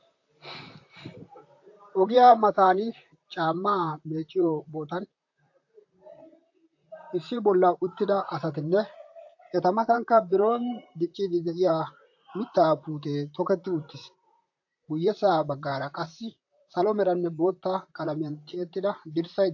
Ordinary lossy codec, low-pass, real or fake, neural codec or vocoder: AAC, 48 kbps; 7.2 kHz; fake; vocoder, 44.1 kHz, 128 mel bands, Pupu-Vocoder